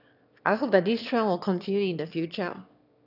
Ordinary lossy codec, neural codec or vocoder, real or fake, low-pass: none; autoencoder, 22.05 kHz, a latent of 192 numbers a frame, VITS, trained on one speaker; fake; 5.4 kHz